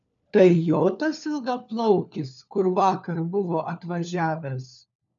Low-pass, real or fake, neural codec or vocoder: 7.2 kHz; fake; codec, 16 kHz, 4 kbps, FunCodec, trained on LibriTTS, 50 frames a second